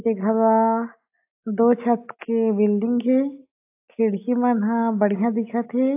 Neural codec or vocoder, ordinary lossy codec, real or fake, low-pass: none; AAC, 24 kbps; real; 3.6 kHz